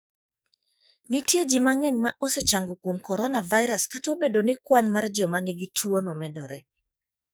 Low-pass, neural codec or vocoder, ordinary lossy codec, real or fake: none; codec, 44.1 kHz, 2.6 kbps, SNAC; none; fake